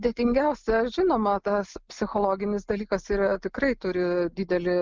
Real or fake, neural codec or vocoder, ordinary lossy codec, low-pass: real; none; Opus, 32 kbps; 7.2 kHz